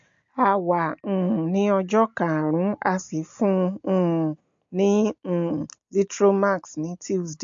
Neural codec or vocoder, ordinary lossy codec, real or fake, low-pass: none; MP3, 48 kbps; real; 7.2 kHz